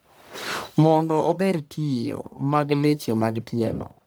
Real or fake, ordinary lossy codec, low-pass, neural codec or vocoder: fake; none; none; codec, 44.1 kHz, 1.7 kbps, Pupu-Codec